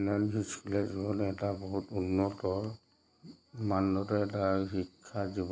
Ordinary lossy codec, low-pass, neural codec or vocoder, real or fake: none; none; none; real